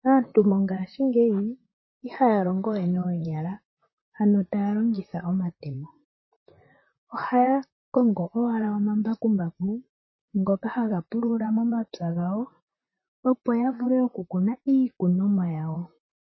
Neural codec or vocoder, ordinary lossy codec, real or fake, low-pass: vocoder, 44.1 kHz, 128 mel bands, Pupu-Vocoder; MP3, 24 kbps; fake; 7.2 kHz